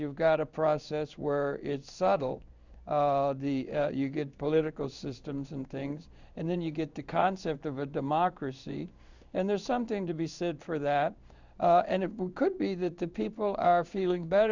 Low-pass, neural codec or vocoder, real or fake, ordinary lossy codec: 7.2 kHz; codec, 16 kHz in and 24 kHz out, 1 kbps, XY-Tokenizer; fake; Opus, 64 kbps